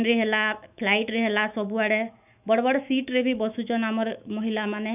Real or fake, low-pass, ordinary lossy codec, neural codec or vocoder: fake; 3.6 kHz; none; autoencoder, 48 kHz, 128 numbers a frame, DAC-VAE, trained on Japanese speech